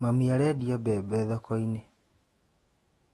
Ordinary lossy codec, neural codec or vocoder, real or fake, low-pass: AAC, 32 kbps; autoencoder, 48 kHz, 128 numbers a frame, DAC-VAE, trained on Japanese speech; fake; 19.8 kHz